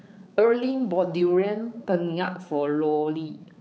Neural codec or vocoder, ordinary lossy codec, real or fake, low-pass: codec, 16 kHz, 4 kbps, X-Codec, HuBERT features, trained on balanced general audio; none; fake; none